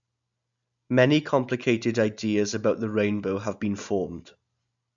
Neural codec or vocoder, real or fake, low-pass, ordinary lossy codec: none; real; 7.2 kHz; none